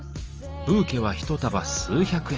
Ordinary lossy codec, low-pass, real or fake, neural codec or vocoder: Opus, 24 kbps; 7.2 kHz; real; none